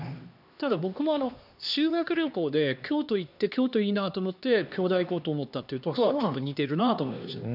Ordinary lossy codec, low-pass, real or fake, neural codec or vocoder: none; 5.4 kHz; fake; codec, 16 kHz, 2 kbps, X-Codec, HuBERT features, trained on LibriSpeech